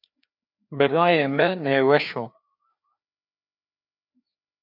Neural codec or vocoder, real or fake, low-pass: codec, 16 kHz, 2 kbps, FreqCodec, larger model; fake; 5.4 kHz